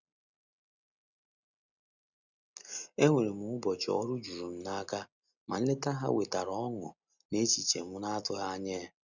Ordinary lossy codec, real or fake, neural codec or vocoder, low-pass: none; real; none; 7.2 kHz